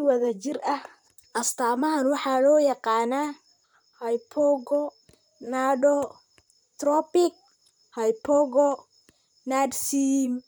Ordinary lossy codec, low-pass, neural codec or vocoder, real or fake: none; none; vocoder, 44.1 kHz, 128 mel bands, Pupu-Vocoder; fake